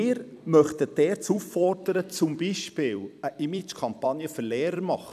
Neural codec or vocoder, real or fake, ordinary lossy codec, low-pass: vocoder, 44.1 kHz, 128 mel bands every 256 samples, BigVGAN v2; fake; none; 14.4 kHz